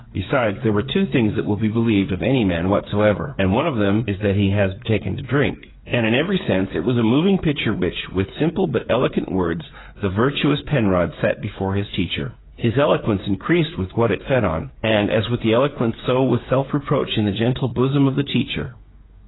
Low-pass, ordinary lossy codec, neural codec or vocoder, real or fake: 7.2 kHz; AAC, 16 kbps; codec, 16 kHz, 8 kbps, FreqCodec, smaller model; fake